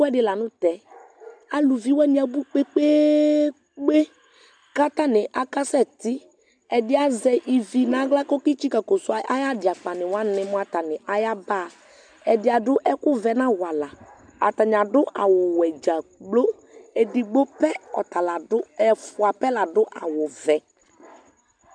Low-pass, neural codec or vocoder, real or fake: 9.9 kHz; none; real